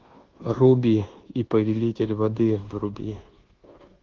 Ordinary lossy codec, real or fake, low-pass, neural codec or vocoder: Opus, 16 kbps; fake; 7.2 kHz; codec, 24 kHz, 1.2 kbps, DualCodec